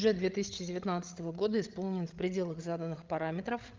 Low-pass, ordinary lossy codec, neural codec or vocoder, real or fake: 7.2 kHz; Opus, 24 kbps; codec, 16 kHz, 16 kbps, FreqCodec, larger model; fake